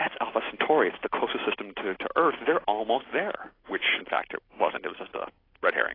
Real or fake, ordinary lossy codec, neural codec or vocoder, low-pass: real; AAC, 24 kbps; none; 5.4 kHz